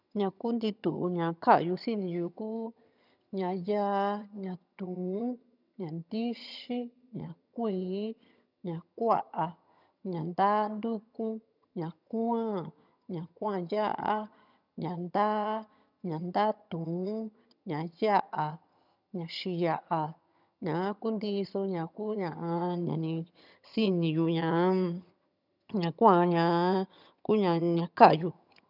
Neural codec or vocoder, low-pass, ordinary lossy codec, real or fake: vocoder, 22.05 kHz, 80 mel bands, HiFi-GAN; 5.4 kHz; none; fake